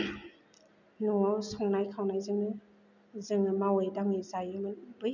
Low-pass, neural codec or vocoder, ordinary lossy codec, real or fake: 7.2 kHz; none; none; real